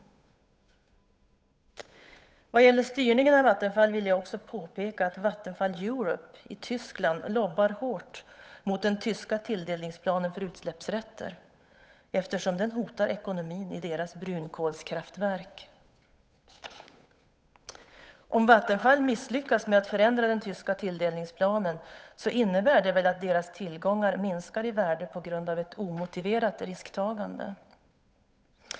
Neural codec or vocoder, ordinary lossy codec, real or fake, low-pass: codec, 16 kHz, 8 kbps, FunCodec, trained on Chinese and English, 25 frames a second; none; fake; none